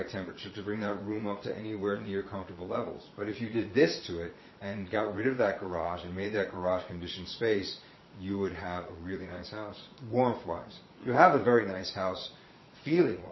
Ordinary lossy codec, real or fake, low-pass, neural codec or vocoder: MP3, 24 kbps; fake; 7.2 kHz; vocoder, 22.05 kHz, 80 mel bands, Vocos